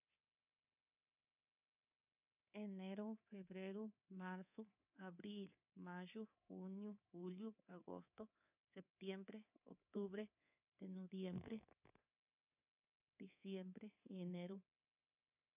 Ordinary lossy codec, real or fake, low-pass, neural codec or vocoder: none; fake; 3.6 kHz; codec, 24 kHz, 3.1 kbps, DualCodec